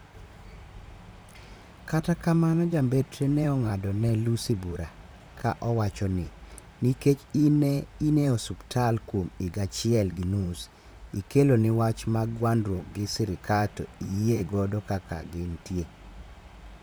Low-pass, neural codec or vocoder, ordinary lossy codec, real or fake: none; vocoder, 44.1 kHz, 128 mel bands, Pupu-Vocoder; none; fake